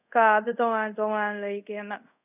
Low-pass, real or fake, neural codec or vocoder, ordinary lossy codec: 3.6 kHz; fake; codec, 24 kHz, 0.5 kbps, DualCodec; none